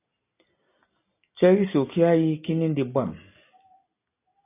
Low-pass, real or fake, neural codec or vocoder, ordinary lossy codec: 3.6 kHz; real; none; AAC, 24 kbps